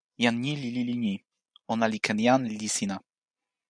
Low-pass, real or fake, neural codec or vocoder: 9.9 kHz; real; none